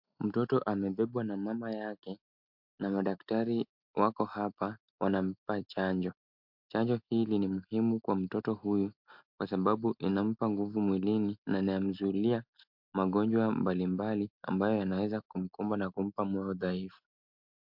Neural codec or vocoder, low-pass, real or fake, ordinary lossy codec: none; 5.4 kHz; real; MP3, 48 kbps